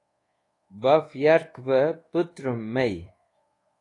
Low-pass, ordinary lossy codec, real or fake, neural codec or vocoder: 10.8 kHz; AAC, 32 kbps; fake; codec, 24 kHz, 1.2 kbps, DualCodec